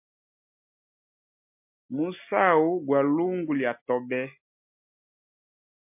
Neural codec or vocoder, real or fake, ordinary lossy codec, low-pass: none; real; MP3, 32 kbps; 3.6 kHz